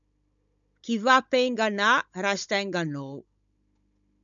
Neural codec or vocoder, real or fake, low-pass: codec, 16 kHz, 16 kbps, FunCodec, trained on Chinese and English, 50 frames a second; fake; 7.2 kHz